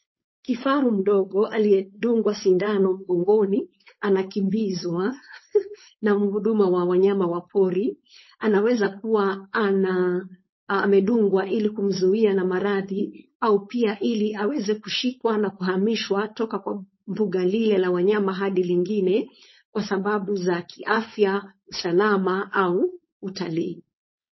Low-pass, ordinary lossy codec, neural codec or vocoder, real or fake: 7.2 kHz; MP3, 24 kbps; codec, 16 kHz, 4.8 kbps, FACodec; fake